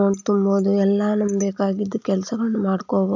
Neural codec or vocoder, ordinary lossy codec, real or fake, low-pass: none; none; real; 7.2 kHz